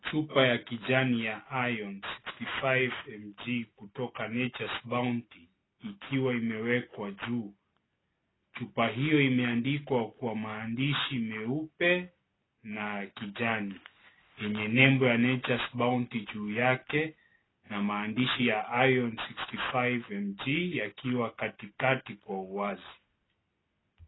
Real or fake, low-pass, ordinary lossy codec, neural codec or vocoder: real; 7.2 kHz; AAC, 16 kbps; none